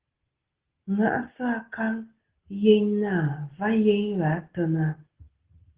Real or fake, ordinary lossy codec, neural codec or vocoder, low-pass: real; Opus, 16 kbps; none; 3.6 kHz